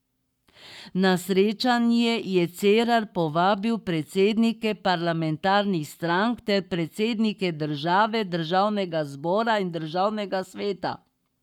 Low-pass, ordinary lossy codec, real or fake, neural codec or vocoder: 19.8 kHz; none; fake; codec, 44.1 kHz, 7.8 kbps, Pupu-Codec